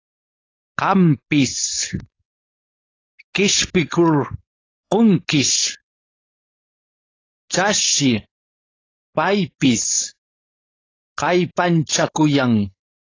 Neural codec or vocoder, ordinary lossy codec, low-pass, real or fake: codec, 16 kHz, 4.8 kbps, FACodec; AAC, 32 kbps; 7.2 kHz; fake